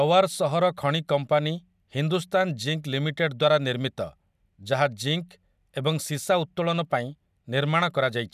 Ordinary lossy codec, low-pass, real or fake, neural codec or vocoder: none; 14.4 kHz; real; none